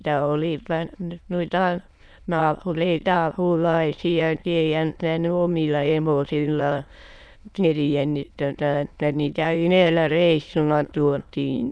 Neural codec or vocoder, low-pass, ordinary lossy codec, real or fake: autoencoder, 22.05 kHz, a latent of 192 numbers a frame, VITS, trained on many speakers; none; none; fake